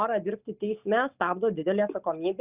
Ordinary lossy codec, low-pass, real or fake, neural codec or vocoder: Opus, 64 kbps; 3.6 kHz; real; none